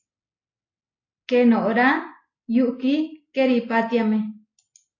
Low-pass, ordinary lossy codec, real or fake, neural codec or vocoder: 7.2 kHz; AAC, 32 kbps; real; none